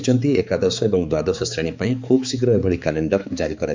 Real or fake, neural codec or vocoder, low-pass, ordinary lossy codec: fake; codec, 16 kHz, 4 kbps, X-Codec, HuBERT features, trained on balanced general audio; 7.2 kHz; MP3, 64 kbps